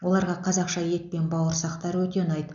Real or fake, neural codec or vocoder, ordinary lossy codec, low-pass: real; none; none; 7.2 kHz